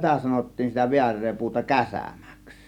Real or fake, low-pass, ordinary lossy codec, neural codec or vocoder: real; 19.8 kHz; none; none